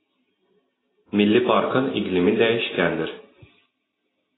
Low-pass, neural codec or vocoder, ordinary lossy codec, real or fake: 7.2 kHz; none; AAC, 16 kbps; real